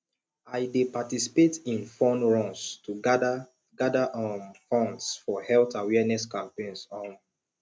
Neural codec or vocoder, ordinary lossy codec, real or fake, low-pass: none; none; real; none